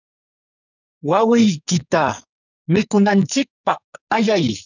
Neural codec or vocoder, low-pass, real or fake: codec, 44.1 kHz, 2.6 kbps, SNAC; 7.2 kHz; fake